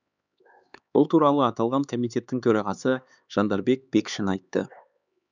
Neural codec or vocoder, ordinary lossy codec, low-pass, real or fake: codec, 16 kHz, 4 kbps, X-Codec, HuBERT features, trained on LibriSpeech; none; 7.2 kHz; fake